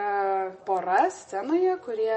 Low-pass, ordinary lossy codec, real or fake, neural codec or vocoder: 10.8 kHz; MP3, 32 kbps; real; none